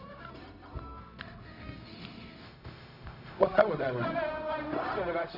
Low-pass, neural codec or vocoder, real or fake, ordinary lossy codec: 5.4 kHz; codec, 16 kHz, 0.4 kbps, LongCat-Audio-Codec; fake; none